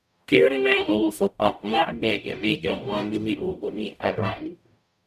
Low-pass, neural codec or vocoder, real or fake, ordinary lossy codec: 14.4 kHz; codec, 44.1 kHz, 0.9 kbps, DAC; fake; none